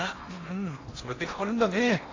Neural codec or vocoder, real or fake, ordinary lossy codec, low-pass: codec, 16 kHz in and 24 kHz out, 0.8 kbps, FocalCodec, streaming, 65536 codes; fake; AAC, 32 kbps; 7.2 kHz